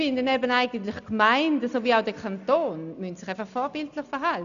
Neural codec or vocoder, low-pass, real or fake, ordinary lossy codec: none; 7.2 kHz; real; none